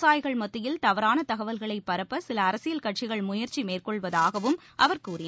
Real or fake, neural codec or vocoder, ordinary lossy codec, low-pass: real; none; none; none